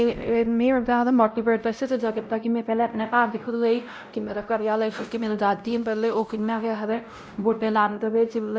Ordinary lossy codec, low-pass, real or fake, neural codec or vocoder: none; none; fake; codec, 16 kHz, 0.5 kbps, X-Codec, WavLM features, trained on Multilingual LibriSpeech